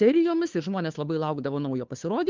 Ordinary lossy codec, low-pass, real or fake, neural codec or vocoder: Opus, 24 kbps; 7.2 kHz; fake; codec, 16 kHz, 2 kbps, FunCodec, trained on Chinese and English, 25 frames a second